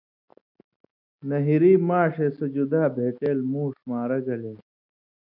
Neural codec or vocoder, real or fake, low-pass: none; real; 5.4 kHz